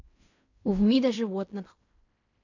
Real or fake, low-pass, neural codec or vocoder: fake; 7.2 kHz; codec, 16 kHz in and 24 kHz out, 0.4 kbps, LongCat-Audio-Codec, fine tuned four codebook decoder